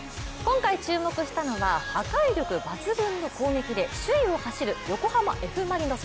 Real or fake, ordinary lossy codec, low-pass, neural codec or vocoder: real; none; none; none